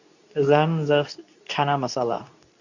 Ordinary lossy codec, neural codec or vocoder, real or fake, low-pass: none; codec, 24 kHz, 0.9 kbps, WavTokenizer, medium speech release version 2; fake; 7.2 kHz